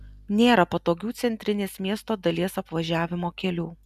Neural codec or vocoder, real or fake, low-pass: none; real; 14.4 kHz